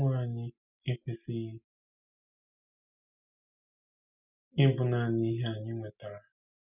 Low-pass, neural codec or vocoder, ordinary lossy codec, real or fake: 3.6 kHz; none; none; real